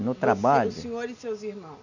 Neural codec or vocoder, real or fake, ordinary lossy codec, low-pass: none; real; none; 7.2 kHz